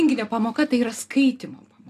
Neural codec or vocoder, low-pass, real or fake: none; 14.4 kHz; real